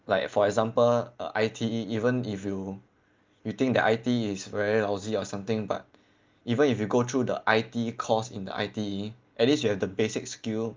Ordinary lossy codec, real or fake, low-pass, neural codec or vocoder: Opus, 32 kbps; real; 7.2 kHz; none